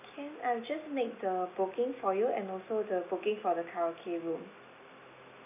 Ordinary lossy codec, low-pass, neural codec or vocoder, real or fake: none; 3.6 kHz; none; real